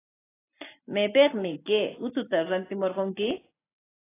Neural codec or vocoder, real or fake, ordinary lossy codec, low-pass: none; real; AAC, 16 kbps; 3.6 kHz